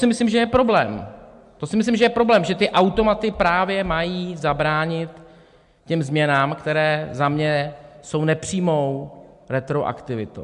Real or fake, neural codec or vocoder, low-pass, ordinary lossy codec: real; none; 10.8 kHz; MP3, 64 kbps